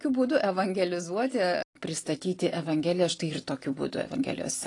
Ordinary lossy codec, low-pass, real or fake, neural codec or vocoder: AAC, 32 kbps; 10.8 kHz; real; none